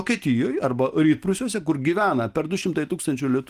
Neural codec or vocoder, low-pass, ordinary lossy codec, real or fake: none; 14.4 kHz; Opus, 24 kbps; real